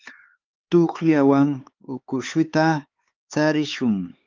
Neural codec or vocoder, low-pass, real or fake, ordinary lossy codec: codec, 16 kHz, 4 kbps, X-Codec, HuBERT features, trained on LibriSpeech; 7.2 kHz; fake; Opus, 32 kbps